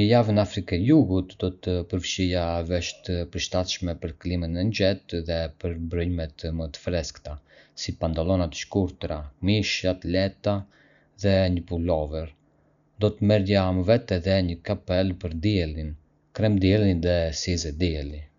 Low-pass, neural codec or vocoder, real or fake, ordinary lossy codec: 7.2 kHz; none; real; none